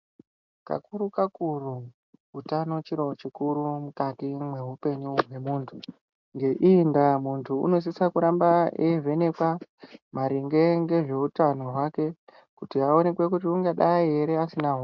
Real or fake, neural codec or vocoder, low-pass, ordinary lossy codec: real; none; 7.2 kHz; MP3, 64 kbps